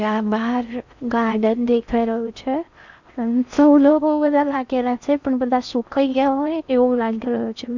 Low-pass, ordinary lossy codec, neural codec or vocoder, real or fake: 7.2 kHz; Opus, 64 kbps; codec, 16 kHz in and 24 kHz out, 0.6 kbps, FocalCodec, streaming, 4096 codes; fake